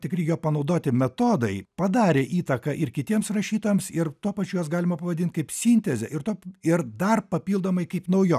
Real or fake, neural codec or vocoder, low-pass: real; none; 14.4 kHz